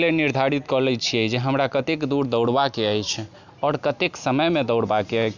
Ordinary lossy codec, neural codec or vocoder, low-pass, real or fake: none; none; 7.2 kHz; real